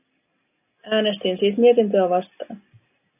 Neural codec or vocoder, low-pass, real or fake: none; 3.6 kHz; real